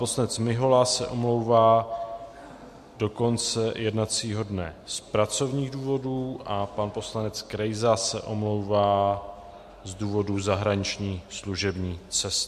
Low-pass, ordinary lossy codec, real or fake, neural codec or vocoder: 14.4 kHz; MP3, 64 kbps; real; none